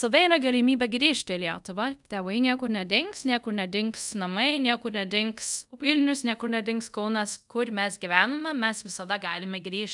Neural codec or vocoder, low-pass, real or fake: codec, 24 kHz, 0.5 kbps, DualCodec; 10.8 kHz; fake